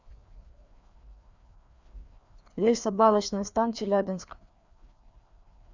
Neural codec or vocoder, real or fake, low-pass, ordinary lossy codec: codec, 16 kHz, 2 kbps, FreqCodec, larger model; fake; 7.2 kHz; Opus, 64 kbps